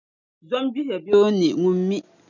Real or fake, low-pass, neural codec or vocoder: real; 7.2 kHz; none